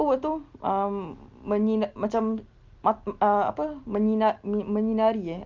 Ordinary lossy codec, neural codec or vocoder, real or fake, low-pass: Opus, 32 kbps; none; real; 7.2 kHz